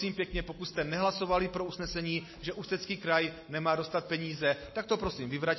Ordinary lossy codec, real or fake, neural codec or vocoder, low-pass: MP3, 24 kbps; real; none; 7.2 kHz